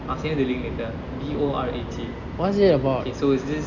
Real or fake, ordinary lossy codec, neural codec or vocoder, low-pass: real; none; none; 7.2 kHz